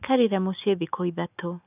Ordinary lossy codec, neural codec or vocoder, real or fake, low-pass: none; none; real; 3.6 kHz